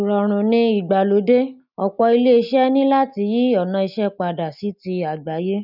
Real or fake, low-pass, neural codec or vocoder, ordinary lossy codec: real; 5.4 kHz; none; none